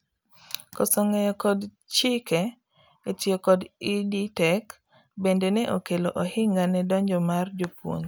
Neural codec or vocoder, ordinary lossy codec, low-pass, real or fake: none; none; none; real